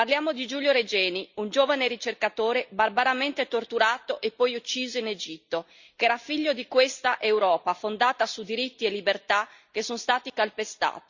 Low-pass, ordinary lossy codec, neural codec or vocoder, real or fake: 7.2 kHz; Opus, 64 kbps; none; real